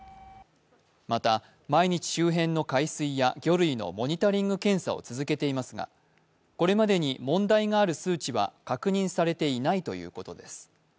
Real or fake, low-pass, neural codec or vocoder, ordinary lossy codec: real; none; none; none